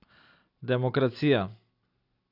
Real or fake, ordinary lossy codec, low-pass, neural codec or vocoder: real; none; 5.4 kHz; none